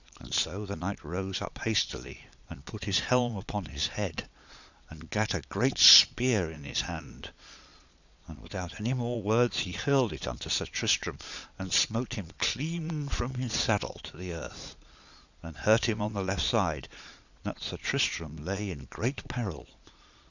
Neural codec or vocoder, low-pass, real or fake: vocoder, 22.05 kHz, 80 mel bands, Vocos; 7.2 kHz; fake